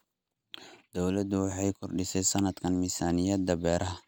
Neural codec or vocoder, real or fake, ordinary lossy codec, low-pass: none; real; none; none